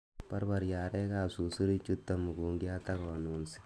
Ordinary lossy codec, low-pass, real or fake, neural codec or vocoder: none; none; real; none